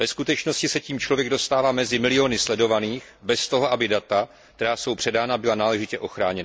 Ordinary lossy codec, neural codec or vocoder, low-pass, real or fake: none; none; none; real